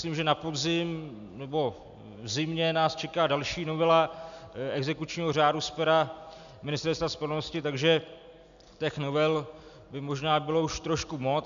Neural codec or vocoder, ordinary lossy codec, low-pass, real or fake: none; MP3, 96 kbps; 7.2 kHz; real